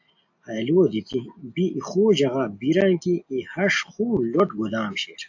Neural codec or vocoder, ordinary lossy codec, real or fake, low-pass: none; AAC, 48 kbps; real; 7.2 kHz